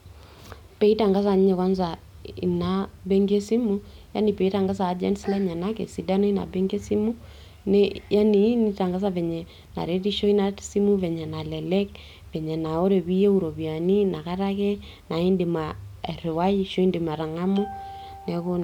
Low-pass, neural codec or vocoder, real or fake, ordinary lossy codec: 19.8 kHz; none; real; none